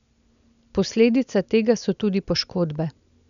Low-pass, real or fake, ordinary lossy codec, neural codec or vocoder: 7.2 kHz; real; none; none